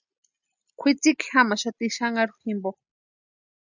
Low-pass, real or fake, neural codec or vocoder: 7.2 kHz; real; none